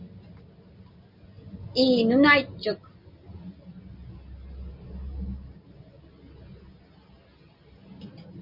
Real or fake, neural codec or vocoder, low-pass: real; none; 5.4 kHz